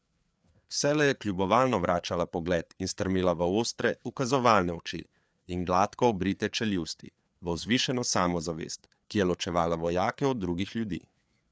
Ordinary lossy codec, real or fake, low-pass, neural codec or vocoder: none; fake; none; codec, 16 kHz, 4 kbps, FreqCodec, larger model